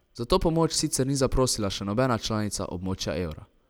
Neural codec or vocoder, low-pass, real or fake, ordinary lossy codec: none; none; real; none